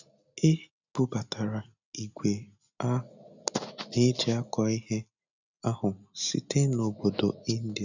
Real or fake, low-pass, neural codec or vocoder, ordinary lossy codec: real; 7.2 kHz; none; none